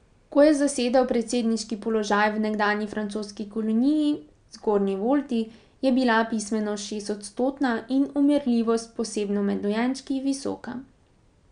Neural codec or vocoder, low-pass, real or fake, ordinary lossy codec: none; 9.9 kHz; real; none